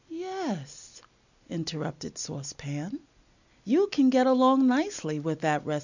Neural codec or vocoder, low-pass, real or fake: none; 7.2 kHz; real